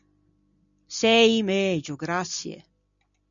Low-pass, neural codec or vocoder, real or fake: 7.2 kHz; none; real